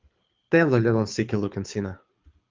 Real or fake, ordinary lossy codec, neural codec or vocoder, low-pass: fake; Opus, 32 kbps; codec, 24 kHz, 6 kbps, HILCodec; 7.2 kHz